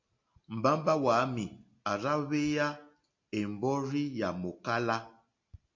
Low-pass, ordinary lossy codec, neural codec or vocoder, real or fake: 7.2 kHz; MP3, 48 kbps; none; real